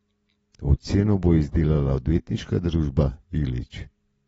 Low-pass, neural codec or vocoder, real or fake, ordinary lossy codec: 14.4 kHz; none; real; AAC, 24 kbps